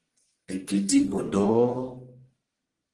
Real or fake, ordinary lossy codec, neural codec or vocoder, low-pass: fake; Opus, 24 kbps; codec, 44.1 kHz, 1.7 kbps, Pupu-Codec; 10.8 kHz